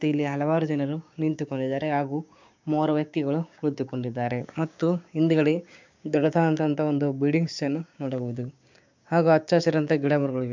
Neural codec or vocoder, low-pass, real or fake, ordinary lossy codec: codec, 16 kHz, 6 kbps, DAC; 7.2 kHz; fake; MP3, 64 kbps